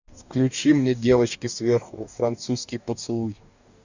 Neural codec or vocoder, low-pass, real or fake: codec, 44.1 kHz, 2.6 kbps, DAC; 7.2 kHz; fake